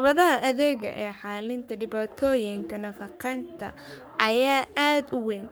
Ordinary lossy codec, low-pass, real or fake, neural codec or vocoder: none; none; fake; codec, 44.1 kHz, 3.4 kbps, Pupu-Codec